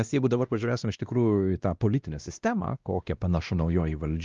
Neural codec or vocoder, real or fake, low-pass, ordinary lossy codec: codec, 16 kHz, 1 kbps, X-Codec, WavLM features, trained on Multilingual LibriSpeech; fake; 7.2 kHz; Opus, 32 kbps